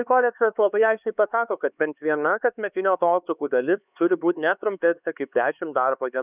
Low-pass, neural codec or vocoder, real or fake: 3.6 kHz; codec, 16 kHz, 4 kbps, X-Codec, HuBERT features, trained on LibriSpeech; fake